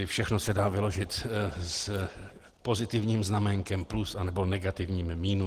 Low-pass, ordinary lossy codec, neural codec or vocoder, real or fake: 14.4 kHz; Opus, 16 kbps; none; real